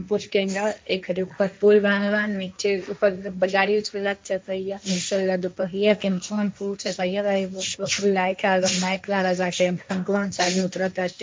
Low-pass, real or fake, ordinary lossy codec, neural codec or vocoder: none; fake; none; codec, 16 kHz, 1.1 kbps, Voila-Tokenizer